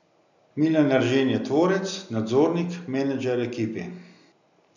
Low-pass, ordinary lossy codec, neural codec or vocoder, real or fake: 7.2 kHz; none; none; real